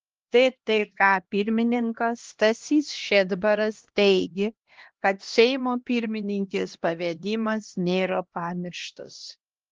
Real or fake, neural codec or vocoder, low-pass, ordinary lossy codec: fake; codec, 16 kHz, 1 kbps, X-Codec, HuBERT features, trained on LibriSpeech; 7.2 kHz; Opus, 16 kbps